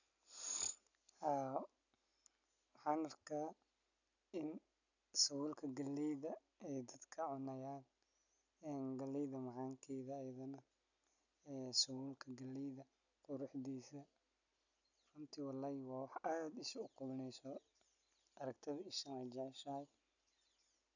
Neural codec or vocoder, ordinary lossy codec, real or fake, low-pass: none; none; real; 7.2 kHz